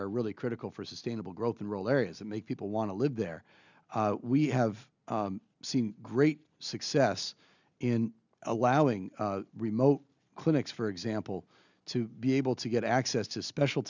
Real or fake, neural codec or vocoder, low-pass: real; none; 7.2 kHz